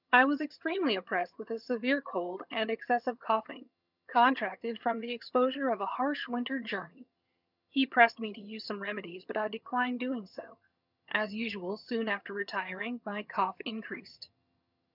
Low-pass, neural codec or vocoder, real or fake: 5.4 kHz; vocoder, 22.05 kHz, 80 mel bands, HiFi-GAN; fake